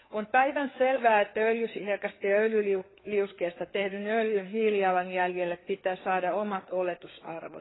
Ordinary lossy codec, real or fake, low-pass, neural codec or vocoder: AAC, 16 kbps; fake; 7.2 kHz; codec, 16 kHz, 4 kbps, FreqCodec, larger model